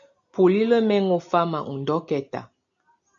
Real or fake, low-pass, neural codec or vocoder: real; 7.2 kHz; none